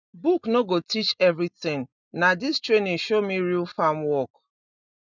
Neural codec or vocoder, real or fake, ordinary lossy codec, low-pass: none; real; none; 7.2 kHz